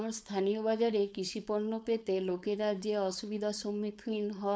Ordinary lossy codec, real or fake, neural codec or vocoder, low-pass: none; fake; codec, 16 kHz, 4.8 kbps, FACodec; none